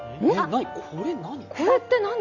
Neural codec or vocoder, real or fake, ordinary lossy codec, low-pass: none; real; none; 7.2 kHz